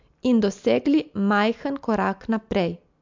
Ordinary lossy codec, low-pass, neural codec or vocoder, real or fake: MP3, 64 kbps; 7.2 kHz; none; real